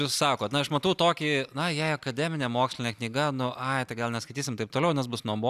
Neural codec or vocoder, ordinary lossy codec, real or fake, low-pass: none; AAC, 96 kbps; real; 14.4 kHz